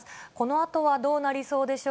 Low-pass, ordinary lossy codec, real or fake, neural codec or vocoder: none; none; real; none